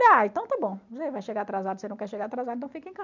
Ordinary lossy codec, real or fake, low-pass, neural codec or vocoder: none; real; 7.2 kHz; none